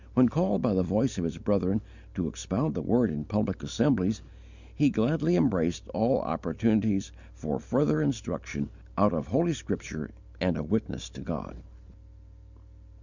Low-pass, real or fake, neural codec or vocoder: 7.2 kHz; real; none